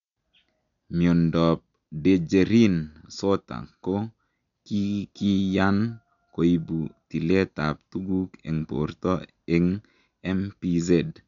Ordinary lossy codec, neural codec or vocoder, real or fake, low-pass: none; none; real; 7.2 kHz